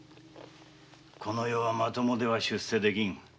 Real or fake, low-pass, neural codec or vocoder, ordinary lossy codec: real; none; none; none